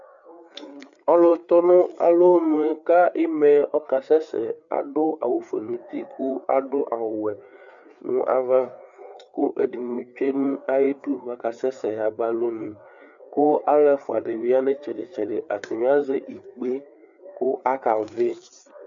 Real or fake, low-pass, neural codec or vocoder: fake; 7.2 kHz; codec, 16 kHz, 4 kbps, FreqCodec, larger model